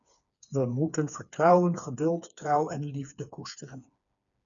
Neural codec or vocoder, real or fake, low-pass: codec, 16 kHz, 4 kbps, FreqCodec, smaller model; fake; 7.2 kHz